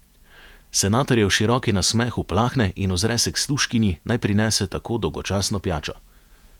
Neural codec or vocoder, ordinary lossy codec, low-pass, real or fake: vocoder, 48 kHz, 128 mel bands, Vocos; none; 19.8 kHz; fake